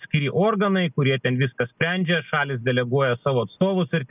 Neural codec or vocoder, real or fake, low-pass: none; real; 3.6 kHz